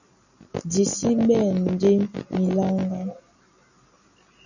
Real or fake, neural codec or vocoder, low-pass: real; none; 7.2 kHz